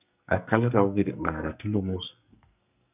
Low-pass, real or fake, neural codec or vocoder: 3.6 kHz; fake; codec, 44.1 kHz, 2.6 kbps, SNAC